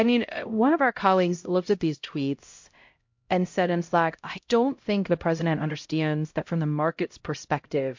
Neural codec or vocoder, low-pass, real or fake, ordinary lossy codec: codec, 16 kHz, 0.5 kbps, X-Codec, HuBERT features, trained on LibriSpeech; 7.2 kHz; fake; MP3, 48 kbps